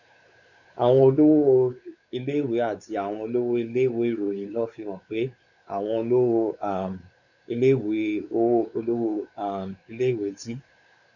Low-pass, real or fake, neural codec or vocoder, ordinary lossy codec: 7.2 kHz; fake; codec, 16 kHz, 4 kbps, X-Codec, WavLM features, trained on Multilingual LibriSpeech; none